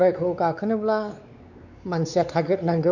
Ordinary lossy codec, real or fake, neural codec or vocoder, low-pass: none; fake; codec, 16 kHz, 4 kbps, X-Codec, WavLM features, trained on Multilingual LibriSpeech; 7.2 kHz